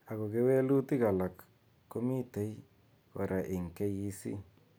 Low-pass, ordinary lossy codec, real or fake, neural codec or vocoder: none; none; real; none